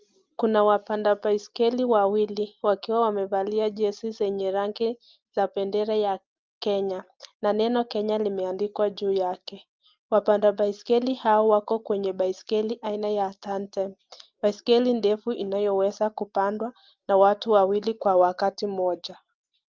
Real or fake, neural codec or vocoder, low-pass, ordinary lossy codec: real; none; 7.2 kHz; Opus, 24 kbps